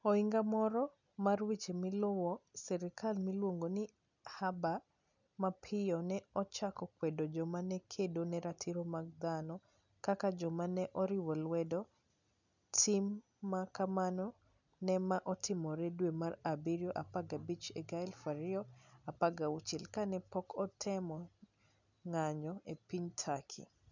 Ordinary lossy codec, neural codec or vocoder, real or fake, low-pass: none; none; real; 7.2 kHz